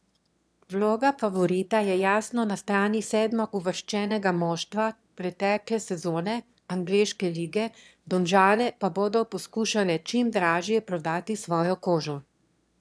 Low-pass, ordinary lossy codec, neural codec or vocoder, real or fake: none; none; autoencoder, 22.05 kHz, a latent of 192 numbers a frame, VITS, trained on one speaker; fake